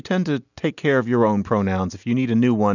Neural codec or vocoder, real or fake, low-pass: none; real; 7.2 kHz